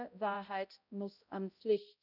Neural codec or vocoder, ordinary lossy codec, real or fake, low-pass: codec, 16 kHz, 0.5 kbps, X-Codec, HuBERT features, trained on balanced general audio; none; fake; 5.4 kHz